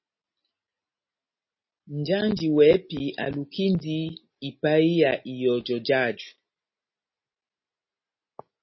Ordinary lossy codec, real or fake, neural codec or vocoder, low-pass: MP3, 24 kbps; real; none; 7.2 kHz